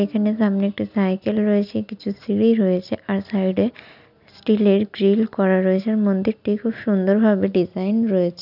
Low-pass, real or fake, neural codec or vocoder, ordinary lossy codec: 5.4 kHz; real; none; none